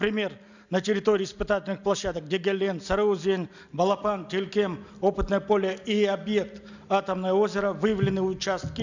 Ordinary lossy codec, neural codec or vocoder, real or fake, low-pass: none; none; real; 7.2 kHz